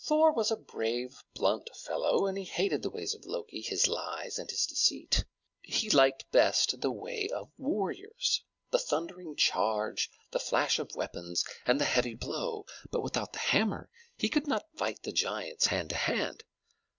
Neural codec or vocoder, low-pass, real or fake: none; 7.2 kHz; real